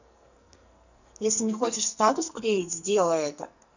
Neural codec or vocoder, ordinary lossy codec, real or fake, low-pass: codec, 44.1 kHz, 2.6 kbps, SNAC; none; fake; 7.2 kHz